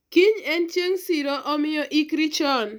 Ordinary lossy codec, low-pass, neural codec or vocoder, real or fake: none; none; none; real